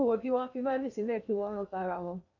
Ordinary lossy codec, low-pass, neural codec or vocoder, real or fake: none; 7.2 kHz; codec, 16 kHz in and 24 kHz out, 0.6 kbps, FocalCodec, streaming, 2048 codes; fake